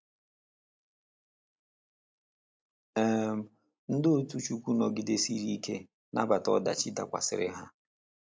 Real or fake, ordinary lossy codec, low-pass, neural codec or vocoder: real; none; none; none